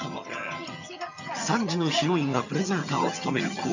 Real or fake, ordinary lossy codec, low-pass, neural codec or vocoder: fake; none; 7.2 kHz; vocoder, 22.05 kHz, 80 mel bands, HiFi-GAN